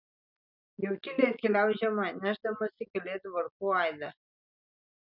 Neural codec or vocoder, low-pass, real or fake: none; 5.4 kHz; real